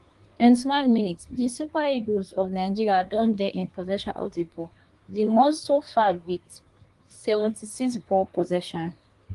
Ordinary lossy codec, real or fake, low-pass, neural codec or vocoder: Opus, 24 kbps; fake; 10.8 kHz; codec, 24 kHz, 1 kbps, SNAC